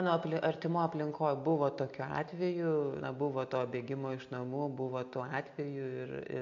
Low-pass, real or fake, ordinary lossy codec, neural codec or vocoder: 7.2 kHz; real; MP3, 48 kbps; none